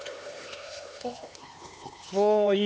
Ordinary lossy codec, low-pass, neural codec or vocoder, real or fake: none; none; codec, 16 kHz, 2 kbps, X-Codec, HuBERT features, trained on LibriSpeech; fake